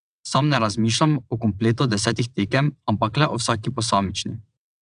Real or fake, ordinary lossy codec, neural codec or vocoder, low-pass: fake; none; vocoder, 22.05 kHz, 80 mel bands, WaveNeXt; 9.9 kHz